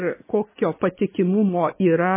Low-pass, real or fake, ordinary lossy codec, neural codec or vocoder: 3.6 kHz; real; MP3, 16 kbps; none